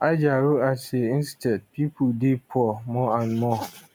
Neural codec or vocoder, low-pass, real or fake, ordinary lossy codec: none; none; real; none